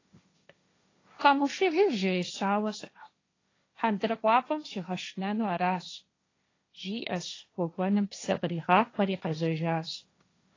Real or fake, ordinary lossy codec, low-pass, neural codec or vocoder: fake; AAC, 32 kbps; 7.2 kHz; codec, 16 kHz, 1.1 kbps, Voila-Tokenizer